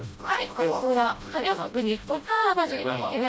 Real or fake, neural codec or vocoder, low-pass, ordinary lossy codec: fake; codec, 16 kHz, 0.5 kbps, FreqCodec, smaller model; none; none